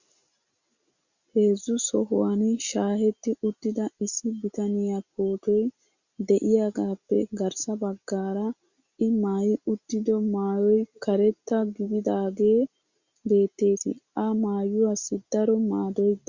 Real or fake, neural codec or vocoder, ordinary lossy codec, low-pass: real; none; Opus, 64 kbps; 7.2 kHz